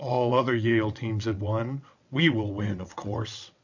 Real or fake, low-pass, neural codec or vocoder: fake; 7.2 kHz; vocoder, 22.05 kHz, 80 mel bands, WaveNeXt